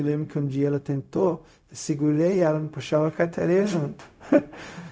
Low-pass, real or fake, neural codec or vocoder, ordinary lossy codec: none; fake; codec, 16 kHz, 0.4 kbps, LongCat-Audio-Codec; none